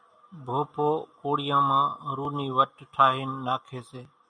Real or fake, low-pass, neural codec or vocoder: real; 9.9 kHz; none